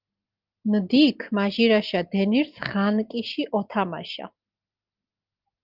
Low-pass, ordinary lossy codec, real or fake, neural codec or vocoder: 5.4 kHz; Opus, 32 kbps; real; none